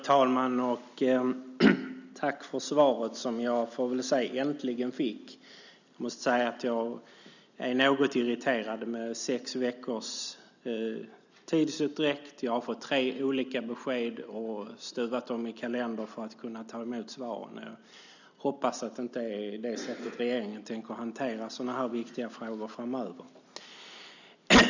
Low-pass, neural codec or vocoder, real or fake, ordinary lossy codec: 7.2 kHz; none; real; none